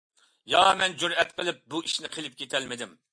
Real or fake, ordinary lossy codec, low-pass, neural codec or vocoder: real; MP3, 64 kbps; 9.9 kHz; none